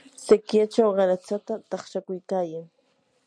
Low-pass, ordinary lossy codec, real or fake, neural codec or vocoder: 9.9 kHz; MP3, 96 kbps; real; none